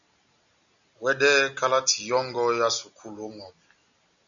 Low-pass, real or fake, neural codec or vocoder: 7.2 kHz; real; none